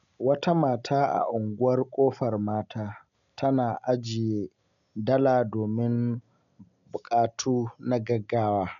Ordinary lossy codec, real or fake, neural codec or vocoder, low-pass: none; real; none; 7.2 kHz